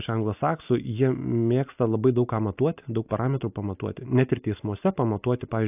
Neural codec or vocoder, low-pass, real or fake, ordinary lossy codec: none; 3.6 kHz; real; AAC, 32 kbps